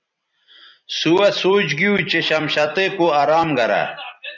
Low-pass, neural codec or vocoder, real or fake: 7.2 kHz; vocoder, 44.1 kHz, 128 mel bands every 256 samples, BigVGAN v2; fake